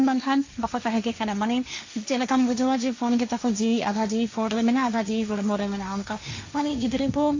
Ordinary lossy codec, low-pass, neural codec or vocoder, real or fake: AAC, 48 kbps; 7.2 kHz; codec, 16 kHz, 1.1 kbps, Voila-Tokenizer; fake